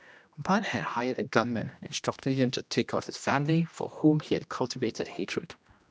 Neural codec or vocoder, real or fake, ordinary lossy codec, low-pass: codec, 16 kHz, 1 kbps, X-Codec, HuBERT features, trained on general audio; fake; none; none